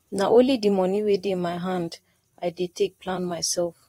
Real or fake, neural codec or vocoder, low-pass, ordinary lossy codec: fake; vocoder, 44.1 kHz, 128 mel bands, Pupu-Vocoder; 19.8 kHz; AAC, 48 kbps